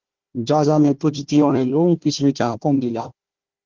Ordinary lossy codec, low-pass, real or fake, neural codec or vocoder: Opus, 16 kbps; 7.2 kHz; fake; codec, 16 kHz, 1 kbps, FunCodec, trained on Chinese and English, 50 frames a second